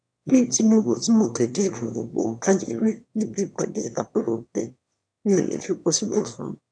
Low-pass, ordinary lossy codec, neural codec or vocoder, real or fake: 9.9 kHz; none; autoencoder, 22.05 kHz, a latent of 192 numbers a frame, VITS, trained on one speaker; fake